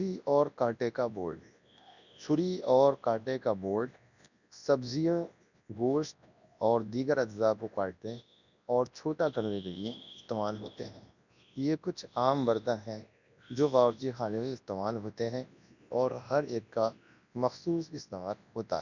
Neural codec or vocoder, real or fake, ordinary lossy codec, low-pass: codec, 24 kHz, 0.9 kbps, WavTokenizer, large speech release; fake; none; 7.2 kHz